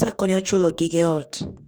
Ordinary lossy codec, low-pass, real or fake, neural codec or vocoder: none; none; fake; codec, 44.1 kHz, 2.6 kbps, DAC